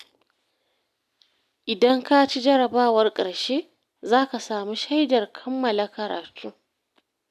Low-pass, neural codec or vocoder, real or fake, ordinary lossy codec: 14.4 kHz; none; real; none